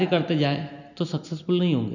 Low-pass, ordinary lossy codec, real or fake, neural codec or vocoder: 7.2 kHz; none; real; none